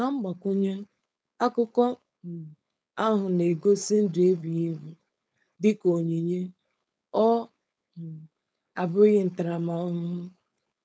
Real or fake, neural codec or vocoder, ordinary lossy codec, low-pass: fake; codec, 16 kHz, 4.8 kbps, FACodec; none; none